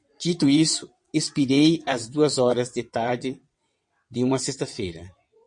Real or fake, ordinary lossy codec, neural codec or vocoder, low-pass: fake; MP3, 48 kbps; vocoder, 22.05 kHz, 80 mel bands, WaveNeXt; 9.9 kHz